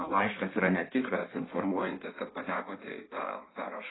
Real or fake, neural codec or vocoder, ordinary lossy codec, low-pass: fake; codec, 16 kHz in and 24 kHz out, 1.1 kbps, FireRedTTS-2 codec; AAC, 16 kbps; 7.2 kHz